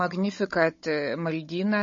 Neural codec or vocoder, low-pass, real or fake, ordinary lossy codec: codec, 16 kHz, 16 kbps, FunCodec, trained on Chinese and English, 50 frames a second; 7.2 kHz; fake; MP3, 32 kbps